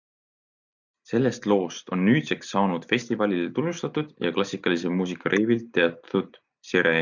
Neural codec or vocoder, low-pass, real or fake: none; 7.2 kHz; real